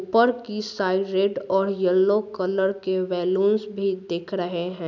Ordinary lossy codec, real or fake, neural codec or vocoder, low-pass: none; real; none; 7.2 kHz